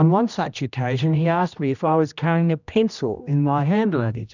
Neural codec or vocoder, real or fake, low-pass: codec, 16 kHz, 1 kbps, X-Codec, HuBERT features, trained on general audio; fake; 7.2 kHz